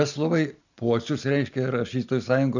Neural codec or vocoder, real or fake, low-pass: none; real; 7.2 kHz